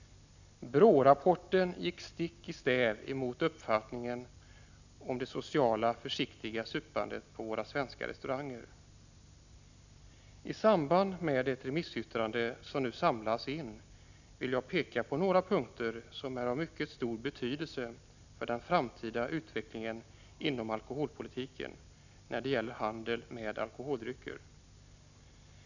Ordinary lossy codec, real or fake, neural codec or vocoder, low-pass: none; real; none; 7.2 kHz